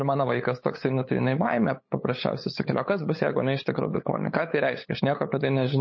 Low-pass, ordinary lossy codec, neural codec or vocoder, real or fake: 7.2 kHz; MP3, 32 kbps; codec, 16 kHz, 8 kbps, FunCodec, trained on LibriTTS, 25 frames a second; fake